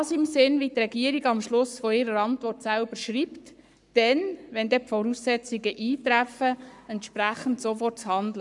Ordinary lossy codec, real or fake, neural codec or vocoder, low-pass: none; fake; codec, 44.1 kHz, 7.8 kbps, Pupu-Codec; 10.8 kHz